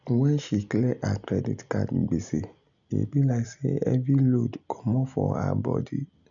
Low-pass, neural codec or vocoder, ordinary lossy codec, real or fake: 7.2 kHz; none; none; real